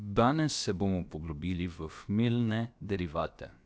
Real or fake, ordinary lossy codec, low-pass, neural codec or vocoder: fake; none; none; codec, 16 kHz, about 1 kbps, DyCAST, with the encoder's durations